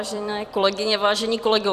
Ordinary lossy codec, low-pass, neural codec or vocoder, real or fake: Opus, 64 kbps; 14.4 kHz; vocoder, 44.1 kHz, 128 mel bands every 256 samples, BigVGAN v2; fake